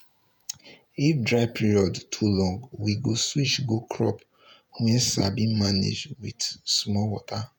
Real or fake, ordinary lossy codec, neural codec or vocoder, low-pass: fake; none; vocoder, 48 kHz, 128 mel bands, Vocos; none